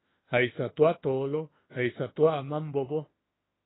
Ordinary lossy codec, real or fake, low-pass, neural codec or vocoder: AAC, 16 kbps; fake; 7.2 kHz; autoencoder, 48 kHz, 32 numbers a frame, DAC-VAE, trained on Japanese speech